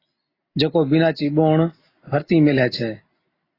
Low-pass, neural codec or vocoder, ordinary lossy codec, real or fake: 5.4 kHz; none; AAC, 24 kbps; real